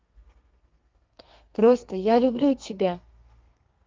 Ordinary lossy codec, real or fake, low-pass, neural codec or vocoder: Opus, 24 kbps; fake; 7.2 kHz; codec, 32 kHz, 1.9 kbps, SNAC